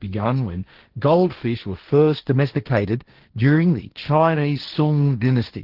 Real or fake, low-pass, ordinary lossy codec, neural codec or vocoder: fake; 5.4 kHz; Opus, 16 kbps; codec, 16 kHz, 1.1 kbps, Voila-Tokenizer